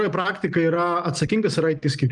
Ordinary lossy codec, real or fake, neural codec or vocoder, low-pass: Opus, 32 kbps; real; none; 10.8 kHz